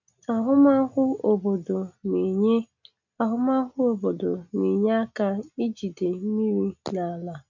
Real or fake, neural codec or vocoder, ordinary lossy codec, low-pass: real; none; none; 7.2 kHz